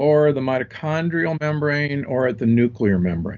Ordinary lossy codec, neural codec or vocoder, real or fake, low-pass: Opus, 32 kbps; none; real; 7.2 kHz